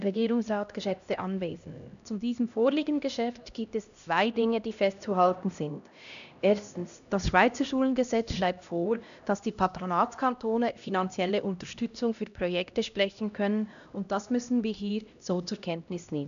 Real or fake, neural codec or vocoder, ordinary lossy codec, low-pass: fake; codec, 16 kHz, 1 kbps, X-Codec, HuBERT features, trained on LibriSpeech; none; 7.2 kHz